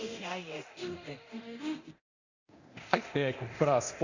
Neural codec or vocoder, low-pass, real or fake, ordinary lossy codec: codec, 24 kHz, 0.9 kbps, DualCodec; 7.2 kHz; fake; Opus, 64 kbps